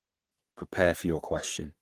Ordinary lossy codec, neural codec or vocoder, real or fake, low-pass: Opus, 24 kbps; codec, 44.1 kHz, 3.4 kbps, Pupu-Codec; fake; 14.4 kHz